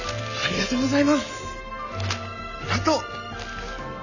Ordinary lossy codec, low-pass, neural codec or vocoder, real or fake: none; 7.2 kHz; none; real